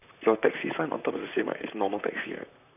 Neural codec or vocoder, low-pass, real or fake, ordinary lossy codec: vocoder, 44.1 kHz, 128 mel bands, Pupu-Vocoder; 3.6 kHz; fake; none